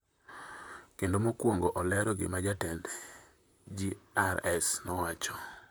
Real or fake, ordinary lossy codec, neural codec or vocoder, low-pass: fake; none; vocoder, 44.1 kHz, 128 mel bands, Pupu-Vocoder; none